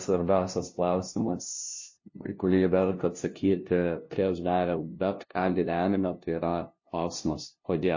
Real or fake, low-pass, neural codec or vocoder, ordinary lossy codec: fake; 7.2 kHz; codec, 16 kHz, 0.5 kbps, FunCodec, trained on LibriTTS, 25 frames a second; MP3, 32 kbps